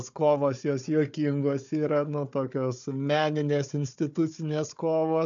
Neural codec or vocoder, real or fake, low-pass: codec, 16 kHz, 4 kbps, FunCodec, trained on Chinese and English, 50 frames a second; fake; 7.2 kHz